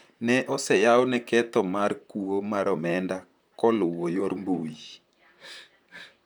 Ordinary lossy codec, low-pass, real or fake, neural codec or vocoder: none; none; fake; vocoder, 44.1 kHz, 128 mel bands, Pupu-Vocoder